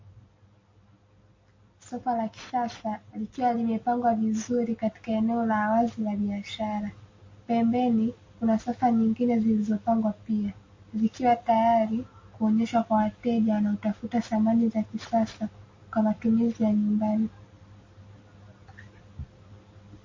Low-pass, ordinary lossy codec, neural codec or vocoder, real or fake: 7.2 kHz; MP3, 32 kbps; none; real